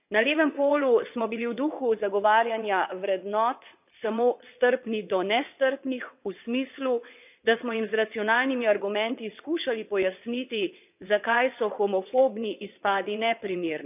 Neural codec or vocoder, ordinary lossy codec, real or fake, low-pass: vocoder, 22.05 kHz, 80 mel bands, WaveNeXt; none; fake; 3.6 kHz